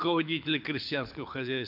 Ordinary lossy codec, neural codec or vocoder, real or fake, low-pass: MP3, 48 kbps; codec, 24 kHz, 6 kbps, HILCodec; fake; 5.4 kHz